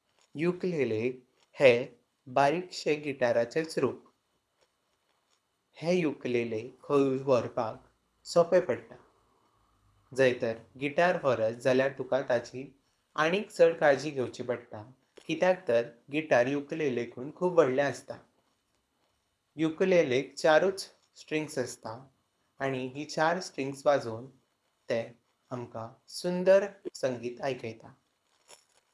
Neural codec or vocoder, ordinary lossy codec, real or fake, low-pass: codec, 24 kHz, 6 kbps, HILCodec; none; fake; none